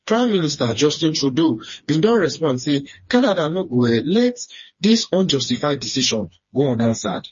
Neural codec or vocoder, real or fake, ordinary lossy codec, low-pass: codec, 16 kHz, 2 kbps, FreqCodec, smaller model; fake; MP3, 32 kbps; 7.2 kHz